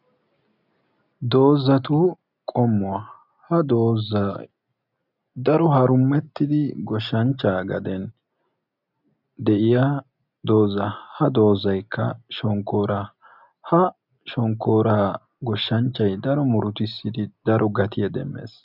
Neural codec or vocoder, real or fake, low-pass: none; real; 5.4 kHz